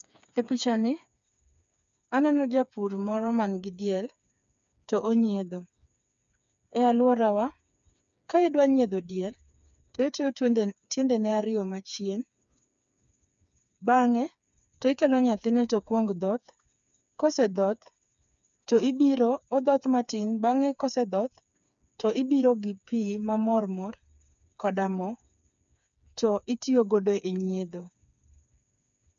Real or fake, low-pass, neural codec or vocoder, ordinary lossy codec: fake; 7.2 kHz; codec, 16 kHz, 4 kbps, FreqCodec, smaller model; none